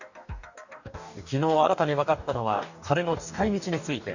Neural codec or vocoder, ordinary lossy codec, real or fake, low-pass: codec, 44.1 kHz, 2.6 kbps, DAC; none; fake; 7.2 kHz